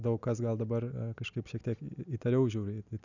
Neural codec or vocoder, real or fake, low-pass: none; real; 7.2 kHz